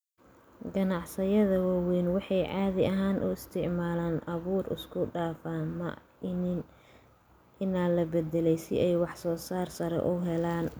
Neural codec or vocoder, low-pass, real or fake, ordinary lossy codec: none; none; real; none